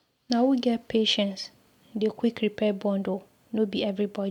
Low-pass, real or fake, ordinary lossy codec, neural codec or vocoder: 19.8 kHz; real; none; none